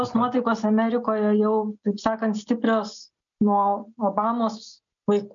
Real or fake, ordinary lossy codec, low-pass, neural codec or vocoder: real; MP3, 64 kbps; 7.2 kHz; none